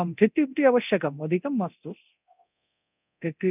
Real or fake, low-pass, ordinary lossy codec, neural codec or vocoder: fake; 3.6 kHz; none; codec, 24 kHz, 0.9 kbps, DualCodec